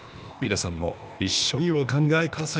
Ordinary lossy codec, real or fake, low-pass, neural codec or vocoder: none; fake; none; codec, 16 kHz, 0.8 kbps, ZipCodec